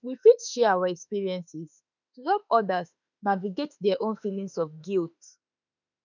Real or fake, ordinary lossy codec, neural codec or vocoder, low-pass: fake; none; autoencoder, 48 kHz, 32 numbers a frame, DAC-VAE, trained on Japanese speech; 7.2 kHz